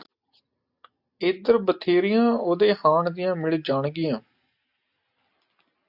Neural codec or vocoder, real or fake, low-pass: none; real; 5.4 kHz